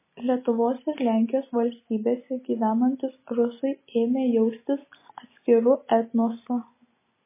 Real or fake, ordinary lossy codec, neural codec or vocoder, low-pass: real; MP3, 16 kbps; none; 3.6 kHz